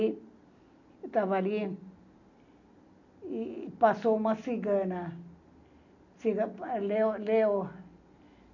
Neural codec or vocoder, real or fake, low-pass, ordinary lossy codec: none; real; 7.2 kHz; none